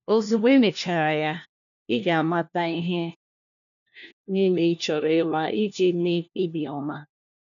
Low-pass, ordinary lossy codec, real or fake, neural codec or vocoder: 7.2 kHz; none; fake; codec, 16 kHz, 1 kbps, FunCodec, trained on LibriTTS, 50 frames a second